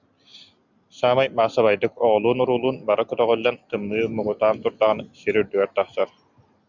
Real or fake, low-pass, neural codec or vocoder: real; 7.2 kHz; none